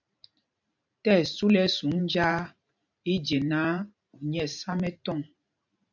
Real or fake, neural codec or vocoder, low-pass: fake; vocoder, 44.1 kHz, 128 mel bands every 512 samples, BigVGAN v2; 7.2 kHz